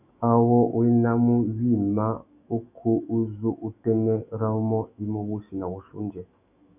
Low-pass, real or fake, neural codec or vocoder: 3.6 kHz; fake; autoencoder, 48 kHz, 128 numbers a frame, DAC-VAE, trained on Japanese speech